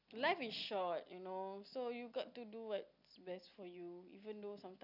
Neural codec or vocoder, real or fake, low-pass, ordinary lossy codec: none; real; 5.4 kHz; none